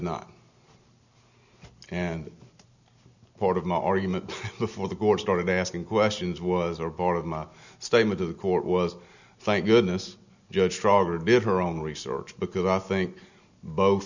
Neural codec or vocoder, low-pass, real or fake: none; 7.2 kHz; real